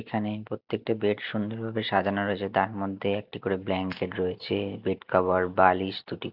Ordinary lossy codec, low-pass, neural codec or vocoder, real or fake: none; 5.4 kHz; none; real